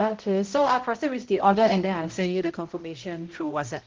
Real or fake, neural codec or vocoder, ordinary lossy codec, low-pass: fake; codec, 16 kHz, 0.5 kbps, X-Codec, HuBERT features, trained on balanced general audio; Opus, 16 kbps; 7.2 kHz